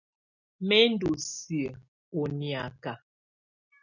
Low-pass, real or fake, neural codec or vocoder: 7.2 kHz; real; none